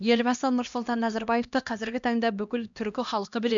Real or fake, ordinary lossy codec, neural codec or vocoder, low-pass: fake; MP3, 64 kbps; codec, 16 kHz, 1 kbps, X-Codec, HuBERT features, trained on LibriSpeech; 7.2 kHz